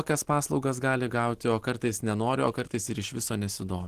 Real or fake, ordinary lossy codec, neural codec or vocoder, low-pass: real; Opus, 16 kbps; none; 14.4 kHz